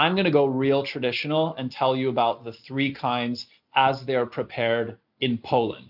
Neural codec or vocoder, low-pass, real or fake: none; 5.4 kHz; real